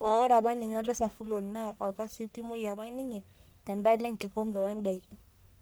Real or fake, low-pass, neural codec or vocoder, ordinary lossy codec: fake; none; codec, 44.1 kHz, 1.7 kbps, Pupu-Codec; none